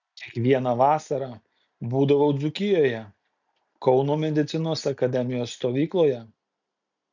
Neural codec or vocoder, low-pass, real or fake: none; 7.2 kHz; real